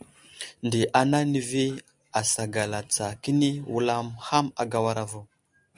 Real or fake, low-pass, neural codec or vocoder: real; 10.8 kHz; none